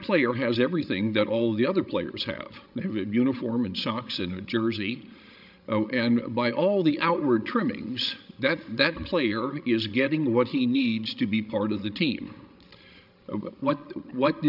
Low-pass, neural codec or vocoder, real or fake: 5.4 kHz; codec, 16 kHz, 16 kbps, FreqCodec, larger model; fake